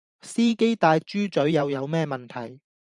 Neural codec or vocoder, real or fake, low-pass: vocoder, 44.1 kHz, 128 mel bands every 512 samples, BigVGAN v2; fake; 10.8 kHz